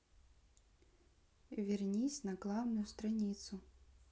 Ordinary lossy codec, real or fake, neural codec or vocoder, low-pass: none; real; none; none